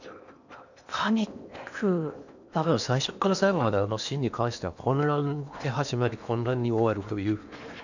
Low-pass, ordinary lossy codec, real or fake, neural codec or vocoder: 7.2 kHz; none; fake; codec, 16 kHz in and 24 kHz out, 0.8 kbps, FocalCodec, streaming, 65536 codes